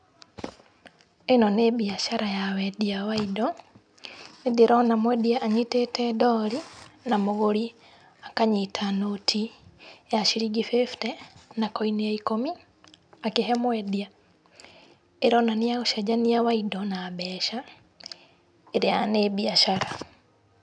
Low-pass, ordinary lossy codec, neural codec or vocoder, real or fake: 9.9 kHz; none; none; real